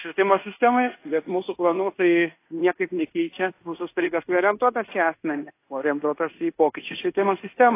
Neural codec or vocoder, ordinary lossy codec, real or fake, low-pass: codec, 16 kHz in and 24 kHz out, 0.9 kbps, LongCat-Audio-Codec, fine tuned four codebook decoder; AAC, 24 kbps; fake; 3.6 kHz